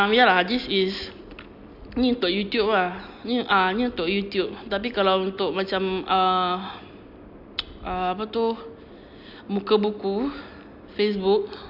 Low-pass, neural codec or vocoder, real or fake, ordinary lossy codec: 5.4 kHz; none; real; AAC, 48 kbps